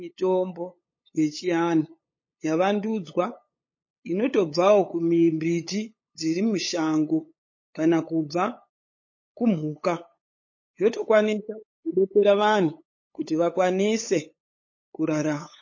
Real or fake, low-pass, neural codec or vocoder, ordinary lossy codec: fake; 7.2 kHz; codec, 16 kHz, 8 kbps, FunCodec, trained on LibriTTS, 25 frames a second; MP3, 32 kbps